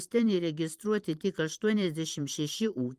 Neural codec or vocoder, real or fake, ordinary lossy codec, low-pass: vocoder, 44.1 kHz, 128 mel bands every 512 samples, BigVGAN v2; fake; Opus, 24 kbps; 14.4 kHz